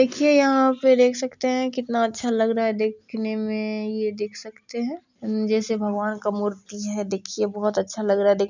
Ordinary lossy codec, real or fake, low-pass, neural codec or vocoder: none; real; 7.2 kHz; none